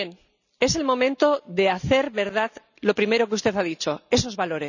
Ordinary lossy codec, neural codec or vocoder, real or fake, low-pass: none; none; real; 7.2 kHz